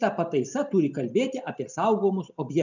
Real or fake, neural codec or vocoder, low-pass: real; none; 7.2 kHz